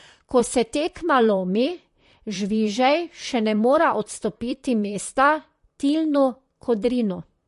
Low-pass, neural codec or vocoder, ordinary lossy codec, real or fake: 14.4 kHz; vocoder, 44.1 kHz, 128 mel bands, Pupu-Vocoder; MP3, 48 kbps; fake